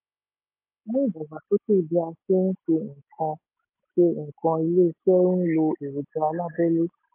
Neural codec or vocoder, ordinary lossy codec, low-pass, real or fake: none; MP3, 24 kbps; 3.6 kHz; real